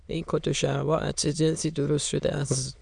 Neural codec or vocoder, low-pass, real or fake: autoencoder, 22.05 kHz, a latent of 192 numbers a frame, VITS, trained on many speakers; 9.9 kHz; fake